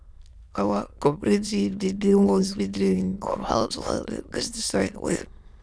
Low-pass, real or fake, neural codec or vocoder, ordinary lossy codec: none; fake; autoencoder, 22.05 kHz, a latent of 192 numbers a frame, VITS, trained on many speakers; none